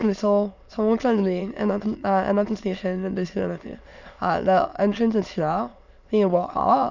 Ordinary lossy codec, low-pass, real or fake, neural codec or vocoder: none; 7.2 kHz; fake; autoencoder, 22.05 kHz, a latent of 192 numbers a frame, VITS, trained on many speakers